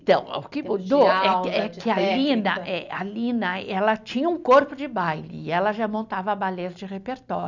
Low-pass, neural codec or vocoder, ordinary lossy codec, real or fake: 7.2 kHz; none; none; real